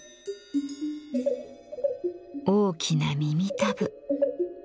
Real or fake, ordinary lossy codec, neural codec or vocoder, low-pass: real; none; none; none